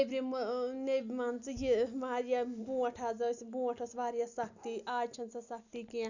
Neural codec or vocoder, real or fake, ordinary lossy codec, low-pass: none; real; none; 7.2 kHz